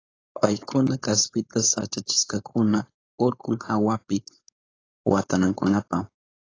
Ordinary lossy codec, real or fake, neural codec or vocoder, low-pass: AAC, 32 kbps; fake; codec, 16 kHz, 4.8 kbps, FACodec; 7.2 kHz